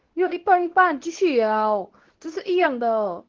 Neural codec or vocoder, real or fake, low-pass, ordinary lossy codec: codec, 24 kHz, 0.9 kbps, WavTokenizer, medium speech release version 2; fake; 7.2 kHz; Opus, 16 kbps